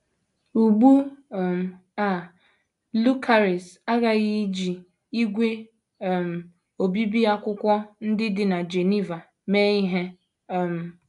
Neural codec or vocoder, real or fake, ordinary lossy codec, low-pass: none; real; AAC, 96 kbps; 10.8 kHz